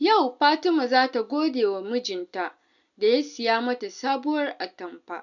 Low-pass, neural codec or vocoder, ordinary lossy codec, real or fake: 7.2 kHz; none; none; real